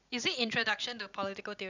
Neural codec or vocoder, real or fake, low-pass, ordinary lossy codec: vocoder, 44.1 kHz, 128 mel bands every 256 samples, BigVGAN v2; fake; 7.2 kHz; none